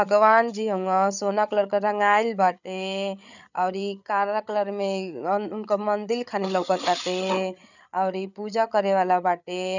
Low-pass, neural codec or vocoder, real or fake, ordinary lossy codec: 7.2 kHz; codec, 16 kHz, 8 kbps, FreqCodec, larger model; fake; none